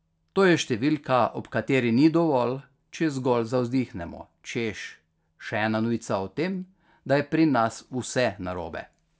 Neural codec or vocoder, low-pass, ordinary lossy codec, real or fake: none; none; none; real